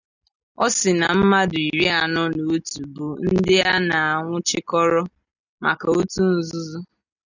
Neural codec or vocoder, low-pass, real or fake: none; 7.2 kHz; real